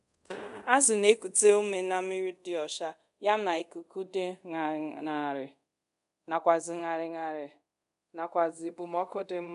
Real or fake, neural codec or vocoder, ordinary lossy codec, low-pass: fake; codec, 24 kHz, 0.5 kbps, DualCodec; none; 10.8 kHz